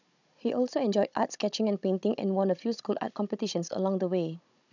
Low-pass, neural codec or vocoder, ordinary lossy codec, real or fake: 7.2 kHz; codec, 16 kHz, 16 kbps, FunCodec, trained on Chinese and English, 50 frames a second; none; fake